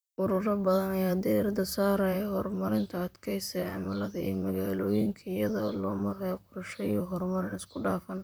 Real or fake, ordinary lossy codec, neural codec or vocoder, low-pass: fake; none; vocoder, 44.1 kHz, 128 mel bands, Pupu-Vocoder; none